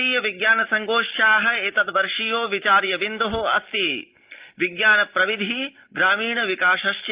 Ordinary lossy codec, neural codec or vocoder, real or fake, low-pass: Opus, 32 kbps; none; real; 3.6 kHz